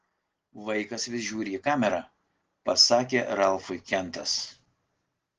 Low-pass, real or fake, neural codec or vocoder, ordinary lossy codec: 7.2 kHz; real; none; Opus, 16 kbps